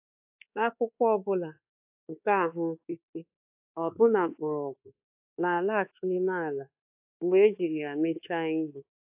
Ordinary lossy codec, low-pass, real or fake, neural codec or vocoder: AAC, 32 kbps; 3.6 kHz; fake; codec, 24 kHz, 1.2 kbps, DualCodec